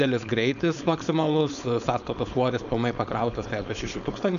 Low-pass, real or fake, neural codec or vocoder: 7.2 kHz; fake; codec, 16 kHz, 4.8 kbps, FACodec